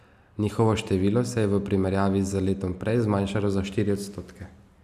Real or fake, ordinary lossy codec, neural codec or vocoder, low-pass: real; none; none; 14.4 kHz